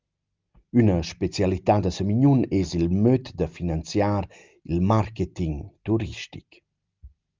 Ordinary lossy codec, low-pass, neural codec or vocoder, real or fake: Opus, 24 kbps; 7.2 kHz; none; real